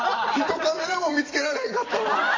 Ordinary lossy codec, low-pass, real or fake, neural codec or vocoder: AAC, 32 kbps; 7.2 kHz; fake; vocoder, 44.1 kHz, 128 mel bands every 256 samples, BigVGAN v2